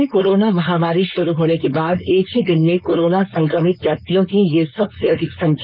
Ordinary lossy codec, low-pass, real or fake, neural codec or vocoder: none; 5.4 kHz; fake; codec, 16 kHz, 4.8 kbps, FACodec